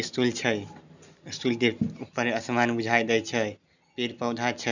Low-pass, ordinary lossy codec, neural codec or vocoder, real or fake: 7.2 kHz; none; none; real